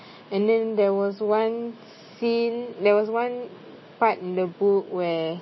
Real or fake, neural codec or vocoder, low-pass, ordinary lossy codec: real; none; 7.2 kHz; MP3, 24 kbps